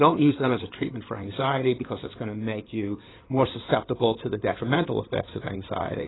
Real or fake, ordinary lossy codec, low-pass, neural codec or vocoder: fake; AAC, 16 kbps; 7.2 kHz; codec, 16 kHz in and 24 kHz out, 2.2 kbps, FireRedTTS-2 codec